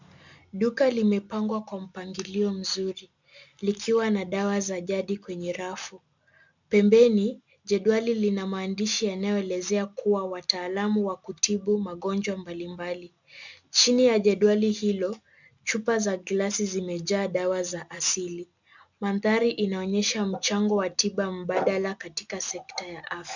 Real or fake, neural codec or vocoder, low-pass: real; none; 7.2 kHz